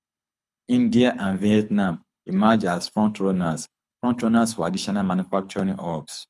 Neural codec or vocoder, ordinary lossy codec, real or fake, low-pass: codec, 24 kHz, 6 kbps, HILCodec; none; fake; none